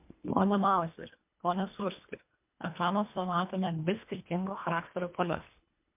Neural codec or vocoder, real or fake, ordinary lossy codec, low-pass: codec, 24 kHz, 1.5 kbps, HILCodec; fake; MP3, 32 kbps; 3.6 kHz